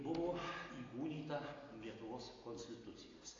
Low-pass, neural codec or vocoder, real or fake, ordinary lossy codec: 7.2 kHz; none; real; AAC, 32 kbps